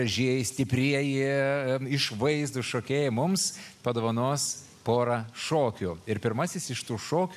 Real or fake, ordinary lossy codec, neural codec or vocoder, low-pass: real; AAC, 96 kbps; none; 14.4 kHz